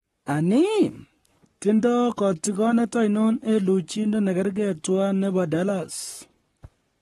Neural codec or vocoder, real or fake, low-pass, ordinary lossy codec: codec, 44.1 kHz, 7.8 kbps, Pupu-Codec; fake; 19.8 kHz; AAC, 32 kbps